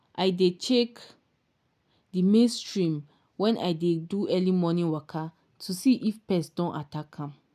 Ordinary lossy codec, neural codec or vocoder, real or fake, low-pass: none; none; real; 14.4 kHz